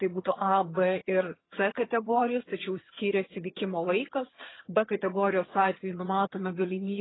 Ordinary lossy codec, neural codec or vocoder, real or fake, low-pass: AAC, 16 kbps; vocoder, 22.05 kHz, 80 mel bands, HiFi-GAN; fake; 7.2 kHz